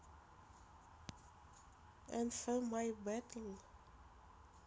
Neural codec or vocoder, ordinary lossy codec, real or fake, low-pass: none; none; real; none